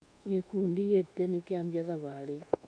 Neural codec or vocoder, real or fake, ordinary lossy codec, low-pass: codec, 24 kHz, 1.2 kbps, DualCodec; fake; none; 9.9 kHz